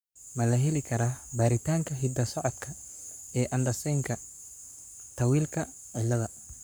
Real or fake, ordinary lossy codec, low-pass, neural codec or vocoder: fake; none; none; codec, 44.1 kHz, 7.8 kbps, Pupu-Codec